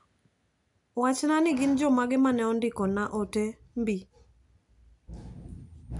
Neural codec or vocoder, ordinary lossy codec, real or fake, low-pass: none; AAC, 64 kbps; real; 10.8 kHz